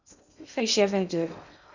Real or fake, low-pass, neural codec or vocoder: fake; 7.2 kHz; codec, 16 kHz in and 24 kHz out, 0.6 kbps, FocalCodec, streaming, 2048 codes